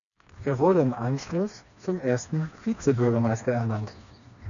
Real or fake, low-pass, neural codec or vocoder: fake; 7.2 kHz; codec, 16 kHz, 2 kbps, FreqCodec, smaller model